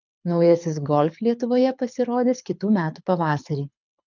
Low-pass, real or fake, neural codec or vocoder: 7.2 kHz; fake; codec, 24 kHz, 6 kbps, HILCodec